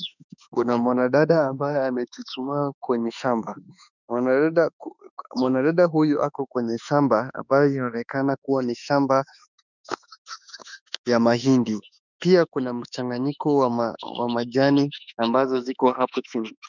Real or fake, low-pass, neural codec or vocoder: fake; 7.2 kHz; autoencoder, 48 kHz, 32 numbers a frame, DAC-VAE, trained on Japanese speech